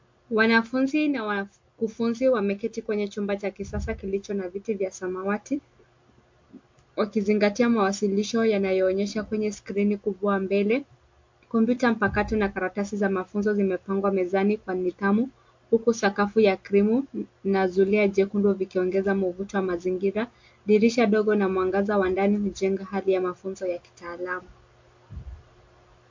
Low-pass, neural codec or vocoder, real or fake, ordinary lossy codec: 7.2 kHz; none; real; MP3, 48 kbps